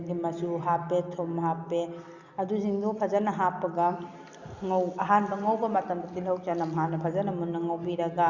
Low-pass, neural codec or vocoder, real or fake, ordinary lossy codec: 7.2 kHz; none; real; Opus, 64 kbps